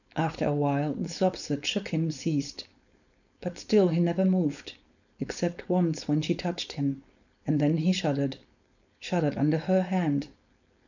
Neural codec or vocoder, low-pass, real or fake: codec, 16 kHz, 4.8 kbps, FACodec; 7.2 kHz; fake